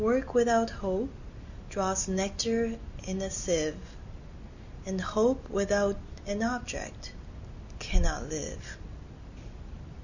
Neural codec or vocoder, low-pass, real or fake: none; 7.2 kHz; real